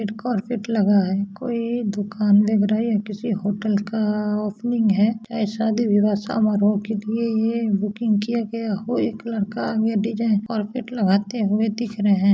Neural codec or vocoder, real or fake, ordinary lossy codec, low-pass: none; real; none; none